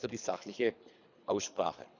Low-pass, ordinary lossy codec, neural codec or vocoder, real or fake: 7.2 kHz; none; codec, 24 kHz, 3 kbps, HILCodec; fake